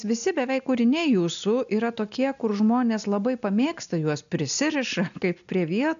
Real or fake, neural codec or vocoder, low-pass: real; none; 7.2 kHz